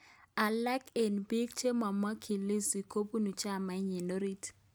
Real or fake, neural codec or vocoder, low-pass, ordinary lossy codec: real; none; none; none